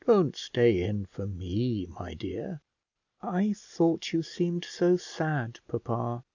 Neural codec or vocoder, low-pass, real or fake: none; 7.2 kHz; real